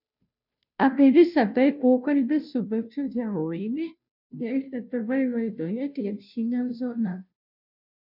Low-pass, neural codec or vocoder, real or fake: 5.4 kHz; codec, 16 kHz, 0.5 kbps, FunCodec, trained on Chinese and English, 25 frames a second; fake